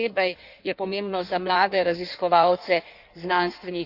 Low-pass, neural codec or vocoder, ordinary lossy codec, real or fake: 5.4 kHz; codec, 16 kHz in and 24 kHz out, 1.1 kbps, FireRedTTS-2 codec; none; fake